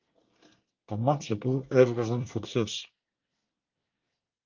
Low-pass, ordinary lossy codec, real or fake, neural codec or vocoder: 7.2 kHz; Opus, 32 kbps; fake; codec, 24 kHz, 1 kbps, SNAC